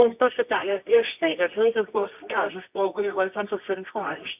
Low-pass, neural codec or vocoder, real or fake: 3.6 kHz; codec, 24 kHz, 0.9 kbps, WavTokenizer, medium music audio release; fake